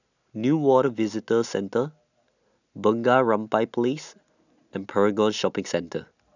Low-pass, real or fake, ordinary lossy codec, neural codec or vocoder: 7.2 kHz; real; none; none